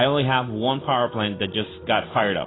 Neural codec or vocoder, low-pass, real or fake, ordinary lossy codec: none; 7.2 kHz; real; AAC, 16 kbps